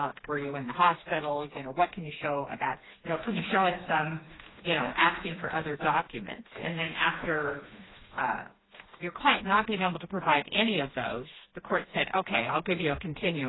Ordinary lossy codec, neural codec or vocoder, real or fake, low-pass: AAC, 16 kbps; codec, 16 kHz, 2 kbps, FreqCodec, smaller model; fake; 7.2 kHz